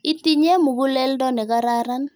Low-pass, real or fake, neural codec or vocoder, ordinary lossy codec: none; real; none; none